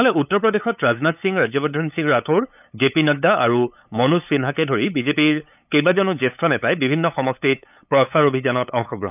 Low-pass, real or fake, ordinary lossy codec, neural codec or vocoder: 3.6 kHz; fake; none; codec, 16 kHz, 8 kbps, FunCodec, trained on LibriTTS, 25 frames a second